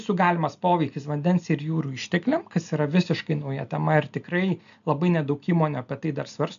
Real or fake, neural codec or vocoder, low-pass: real; none; 7.2 kHz